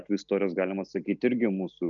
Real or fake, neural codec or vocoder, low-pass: real; none; 7.2 kHz